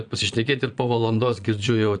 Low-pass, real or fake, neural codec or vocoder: 9.9 kHz; fake; vocoder, 22.05 kHz, 80 mel bands, Vocos